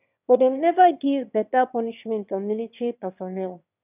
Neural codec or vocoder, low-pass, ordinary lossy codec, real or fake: autoencoder, 22.05 kHz, a latent of 192 numbers a frame, VITS, trained on one speaker; 3.6 kHz; none; fake